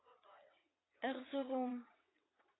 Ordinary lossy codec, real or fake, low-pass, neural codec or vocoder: AAC, 16 kbps; fake; 7.2 kHz; vocoder, 22.05 kHz, 80 mel bands, WaveNeXt